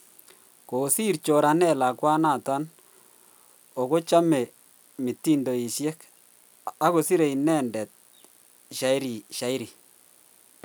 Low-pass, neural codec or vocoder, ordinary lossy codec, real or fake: none; none; none; real